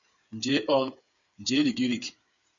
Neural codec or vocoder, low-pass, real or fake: codec, 16 kHz, 8 kbps, FreqCodec, smaller model; 7.2 kHz; fake